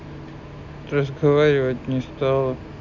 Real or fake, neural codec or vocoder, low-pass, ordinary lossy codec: real; none; 7.2 kHz; none